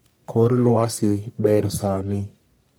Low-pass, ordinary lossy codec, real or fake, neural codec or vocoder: none; none; fake; codec, 44.1 kHz, 1.7 kbps, Pupu-Codec